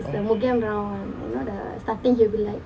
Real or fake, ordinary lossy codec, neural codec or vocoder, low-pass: real; none; none; none